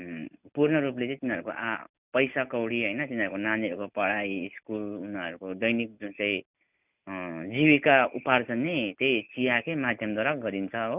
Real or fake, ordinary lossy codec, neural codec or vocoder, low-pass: real; none; none; 3.6 kHz